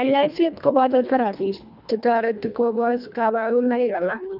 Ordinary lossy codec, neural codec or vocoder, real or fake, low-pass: none; codec, 24 kHz, 1.5 kbps, HILCodec; fake; 5.4 kHz